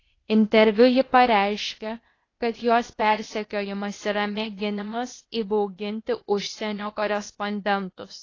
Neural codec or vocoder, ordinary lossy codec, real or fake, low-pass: codec, 16 kHz, 0.8 kbps, ZipCodec; AAC, 32 kbps; fake; 7.2 kHz